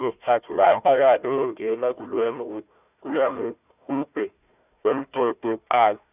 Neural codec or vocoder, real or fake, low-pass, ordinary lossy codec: codec, 16 kHz, 1 kbps, FunCodec, trained on Chinese and English, 50 frames a second; fake; 3.6 kHz; none